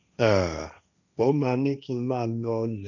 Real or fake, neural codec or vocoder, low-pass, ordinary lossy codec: fake; codec, 16 kHz, 1.1 kbps, Voila-Tokenizer; 7.2 kHz; none